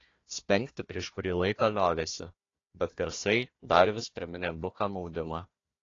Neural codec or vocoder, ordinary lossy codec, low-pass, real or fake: codec, 16 kHz, 1 kbps, FunCodec, trained on Chinese and English, 50 frames a second; AAC, 32 kbps; 7.2 kHz; fake